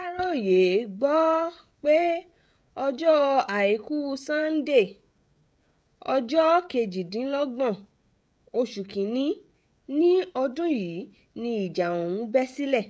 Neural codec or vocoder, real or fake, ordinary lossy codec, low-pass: codec, 16 kHz, 16 kbps, FreqCodec, smaller model; fake; none; none